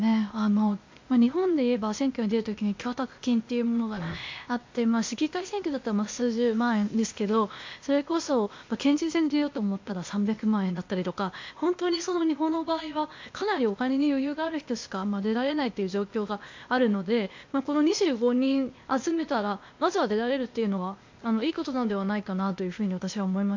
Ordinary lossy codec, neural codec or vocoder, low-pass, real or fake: MP3, 48 kbps; codec, 16 kHz, 0.8 kbps, ZipCodec; 7.2 kHz; fake